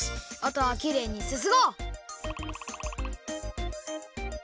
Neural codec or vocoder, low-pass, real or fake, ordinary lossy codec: none; none; real; none